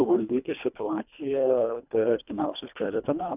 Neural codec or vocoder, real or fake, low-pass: codec, 24 kHz, 1.5 kbps, HILCodec; fake; 3.6 kHz